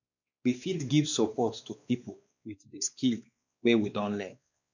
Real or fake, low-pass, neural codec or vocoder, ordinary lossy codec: fake; 7.2 kHz; codec, 16 kHz, 2 kbps, X-Codec, WavLM features, trained on Multilingual LibriSpeech; none